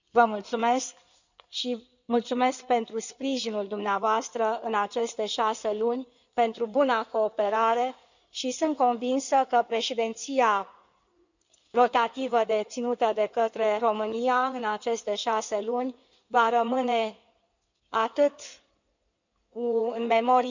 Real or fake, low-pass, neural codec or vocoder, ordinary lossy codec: fake; 7.2 kHz; codec, 16 kHz in and 24 kHz out, 2.2 kbps, FireRedTTS-2 codec; none